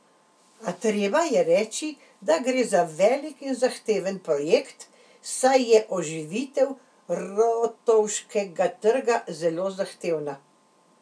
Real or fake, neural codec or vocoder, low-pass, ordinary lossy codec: real; none; none; none